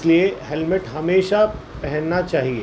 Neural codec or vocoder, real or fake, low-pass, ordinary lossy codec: none; real; none; none